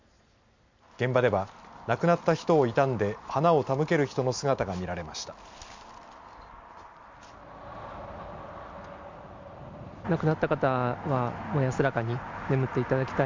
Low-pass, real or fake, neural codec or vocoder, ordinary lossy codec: 7.2 kHz; real; none; MP3, 64 kbps